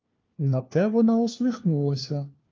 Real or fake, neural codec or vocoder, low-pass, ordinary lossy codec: fake; codec, 16 kHz, 1 kbps, FunCodec, trained on LibriTTS, 50 frames a second; 7.2 kHz; Opus, 24 kbps